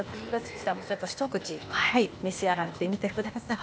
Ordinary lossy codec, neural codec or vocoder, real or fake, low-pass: none; codec, 16 kHz, 0.8 kbps, ZipCodec; fake; none